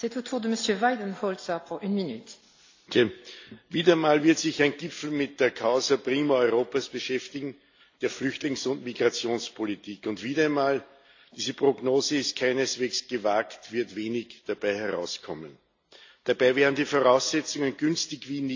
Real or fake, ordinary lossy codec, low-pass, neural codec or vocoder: real; AAC, 48 kbps; 7.2 kHz; none